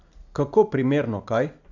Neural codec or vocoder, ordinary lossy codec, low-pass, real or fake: none; none; 7.2 kHz; real